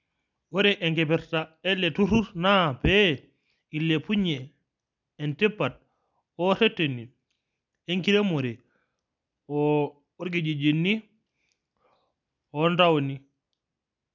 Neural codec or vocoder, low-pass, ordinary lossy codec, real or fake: none; 7.2 kHz; none; real